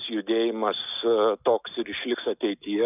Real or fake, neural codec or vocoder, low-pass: real; none; 3.6 kHz